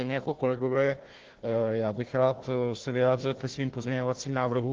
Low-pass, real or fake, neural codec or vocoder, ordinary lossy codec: 7.2 kHz; fake; codec, 16 kHz, 1 kbps, FunCodec, trained on Chinese and English, 50 frames a second; Opus, 16 kbps